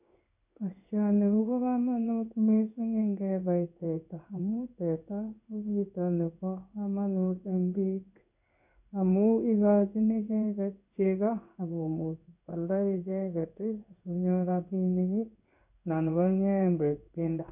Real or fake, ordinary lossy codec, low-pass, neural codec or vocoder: fake; none; 3.6 kHz; codec, 16 kHz in and 24 kHz out, 1 kbps, XY-Tokenizer